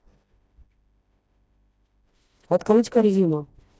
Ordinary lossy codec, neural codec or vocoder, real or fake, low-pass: none; codec, 16 kHz, 1 kbps, FreqCodec, smaller model; fake; none